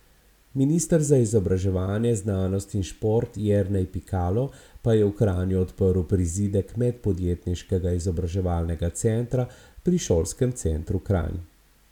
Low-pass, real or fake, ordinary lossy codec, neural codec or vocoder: 19.8 kHz; real; none; none